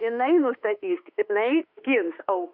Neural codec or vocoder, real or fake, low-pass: autoencoder, 48 kHz, 32 numbers a frame, DAC-VAE, trained on Japanese speech; fake; 5.4 kHz